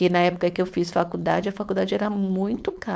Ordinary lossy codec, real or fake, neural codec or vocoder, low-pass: none; fake; codec, 16 kHz, 4.8 kbps, FACodec; none